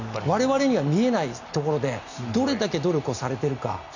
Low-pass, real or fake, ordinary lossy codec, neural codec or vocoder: 7.2 kHz; real; none; none